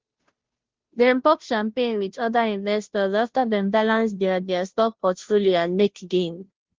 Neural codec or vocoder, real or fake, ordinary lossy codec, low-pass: codec, 16 kHz, 0.5 kbps, FunCodec, trained on Chinese and English, 25 frames a second; fake; Opus, 16 kbps; 7.2 kHz